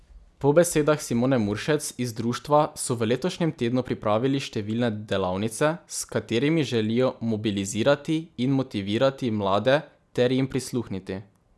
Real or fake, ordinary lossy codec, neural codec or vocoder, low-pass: real; none; none; none